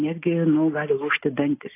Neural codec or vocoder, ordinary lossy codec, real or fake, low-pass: none; AAC, 24 kbps; real; 3.6 kHz